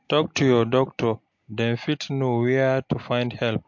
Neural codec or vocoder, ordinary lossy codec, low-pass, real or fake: none; MP3, 48 kbps; 7.2 kHz; real